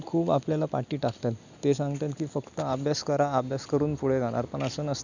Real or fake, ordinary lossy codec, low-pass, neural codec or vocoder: real; none; 7.2 kHz; none